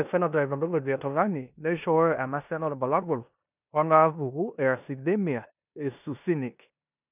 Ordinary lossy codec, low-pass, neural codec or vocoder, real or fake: none; 3.6 kHz; codec, 16 kHz in and 24 kHz out, 0.9 kbps, LongCat-Audio-Codec, four codebook decoder; fake